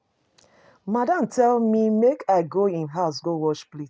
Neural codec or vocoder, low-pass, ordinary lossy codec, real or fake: none; none; none; real